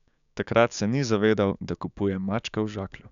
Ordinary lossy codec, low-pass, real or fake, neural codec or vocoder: none; 7.2 kHz; fake; codec, 16 kHz, 6 kbps, DAC